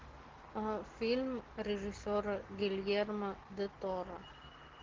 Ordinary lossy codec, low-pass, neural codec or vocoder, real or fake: Opus, 16 kbps; 7.2 kHz; none; real